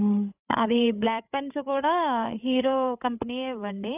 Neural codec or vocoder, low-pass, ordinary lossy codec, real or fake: codec, 16 kHz, 8 kbps, FreqCodec, larger model; 3.6 kHz; none; fake